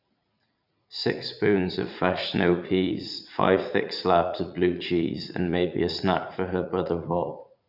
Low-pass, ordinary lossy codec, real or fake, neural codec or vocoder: 5.4 kHz; none; fake; vocoder, 44.1 kHz, 80 mel bands, Vocos